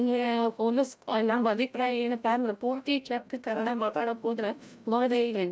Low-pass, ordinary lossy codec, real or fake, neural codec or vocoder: none; none; fake; codec, 16 kHz, 0.5 kbps, FreqCodec, larger model